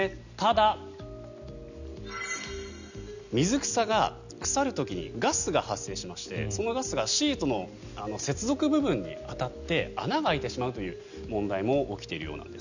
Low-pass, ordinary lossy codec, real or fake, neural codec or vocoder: 7.2 kHz; none; real; none